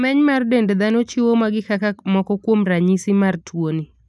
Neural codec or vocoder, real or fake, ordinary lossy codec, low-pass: none; real; none; none